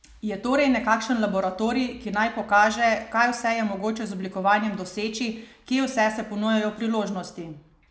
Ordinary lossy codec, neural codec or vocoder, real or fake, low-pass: none; none; real; none